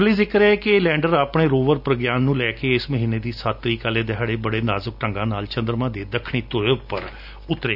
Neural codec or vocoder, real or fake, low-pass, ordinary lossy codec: none; real; 5.4 kHz; none